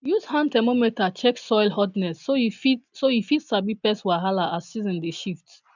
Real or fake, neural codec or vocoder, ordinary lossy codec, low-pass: real; none; none; 7.2 kHz